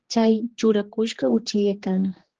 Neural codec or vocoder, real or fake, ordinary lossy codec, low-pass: codec, 16 kHz, 2 kbps, X-Codec, HuBERT features, trained on general audio; fake; Opus, 16 kbps; 7.2 kHz